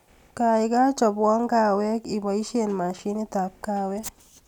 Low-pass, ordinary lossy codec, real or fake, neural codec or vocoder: 19.8 kHz; none; real; none